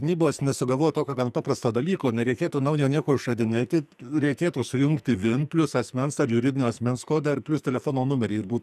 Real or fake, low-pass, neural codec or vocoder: fake; 14.4 kHz; codec, 44.1 kHz, 2.6 kbps, SNAC